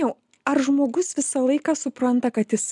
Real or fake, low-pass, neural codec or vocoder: real; 10.8 kHz; none